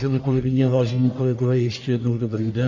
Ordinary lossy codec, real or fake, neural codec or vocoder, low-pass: MP3, 48 kbps; fake; codec, 44.1 kHz, 1.7 kbps, Pupu-Codec; 7.2 kHz